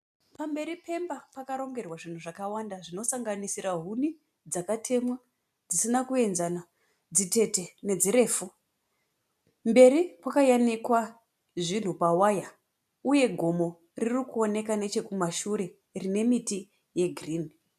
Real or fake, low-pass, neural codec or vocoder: real; 14.4 kHz; none